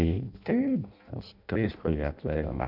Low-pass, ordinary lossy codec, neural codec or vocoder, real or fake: 5.4 kHz; none; codec, 16 kHz in and 24 kHz out, 0.6 kbps, FireRedTTS-2 codec; fake